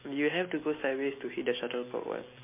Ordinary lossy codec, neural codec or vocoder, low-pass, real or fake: MP3, 24 kbps; none; 3.6 kHz; real